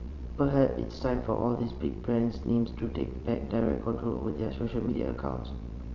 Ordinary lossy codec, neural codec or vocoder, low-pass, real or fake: none; vocoder, 22.05 kHz, 80 mel bands, Vocos; 7.2 kHz; fake